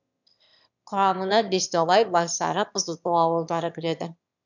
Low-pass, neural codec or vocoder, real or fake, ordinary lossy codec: 7.2 kHz; autoencoder, 22.05 kHz, a latent of 192 numbers a frame, VITS, trained on one speaker; fake; none